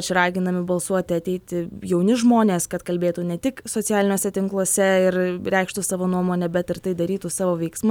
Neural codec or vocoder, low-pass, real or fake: none; 19.8 kHz; real